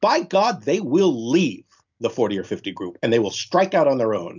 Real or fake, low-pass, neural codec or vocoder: real; 7.2 kHz; none